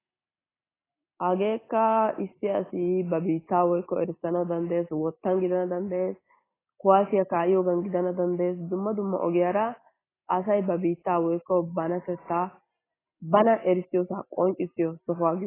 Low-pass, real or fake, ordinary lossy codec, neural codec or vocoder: 3.6 kHz; real; AAC, 16 kbps; none